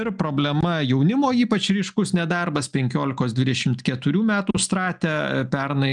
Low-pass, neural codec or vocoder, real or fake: 10.8 kHz; none; real